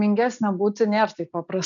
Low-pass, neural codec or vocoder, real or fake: 7.2 kHz; none; real